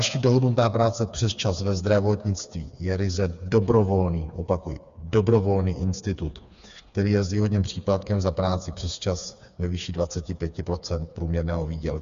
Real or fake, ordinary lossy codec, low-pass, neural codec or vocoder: fake; Opus, 64 kbps; 7.2 kHz; codec, 16 kHz, 4 kbps, FreqCodec, smaller model